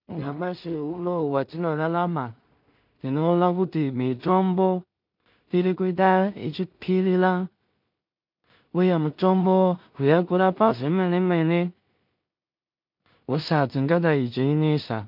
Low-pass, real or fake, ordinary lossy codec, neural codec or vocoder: 5.4 kHz; fake; MP3, 48 kbps; codec, 16 kHz in and 24 kHz out, 0.4 kbps, LongCat-Audio-Codec, two codebook decoder